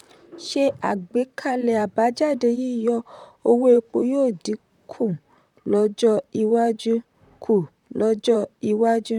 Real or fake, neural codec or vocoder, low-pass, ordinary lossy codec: fake; vocoder, 44.1 kHz, 128 mel bands, Pupu-Vocoder; 19.8 kHz; none